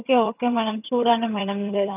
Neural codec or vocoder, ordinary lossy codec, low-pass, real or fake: vocoder, 22.05 kHz, 80 mel bands, HiFi-GAN; none; 3.6 kHz; fake